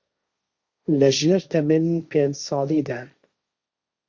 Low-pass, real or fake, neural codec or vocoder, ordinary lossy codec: 7.2 kHz; fake; codec, 16 kHz, 1.1 kbps, Voila-Tokenizer; Opus, 64 kbps